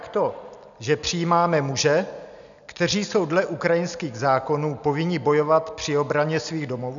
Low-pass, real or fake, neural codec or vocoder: 7.2 kHz; real; none